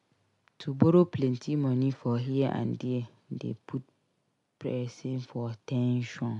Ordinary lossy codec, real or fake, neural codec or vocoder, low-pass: AAC, 64 kbps; real; none; 10.8 kHz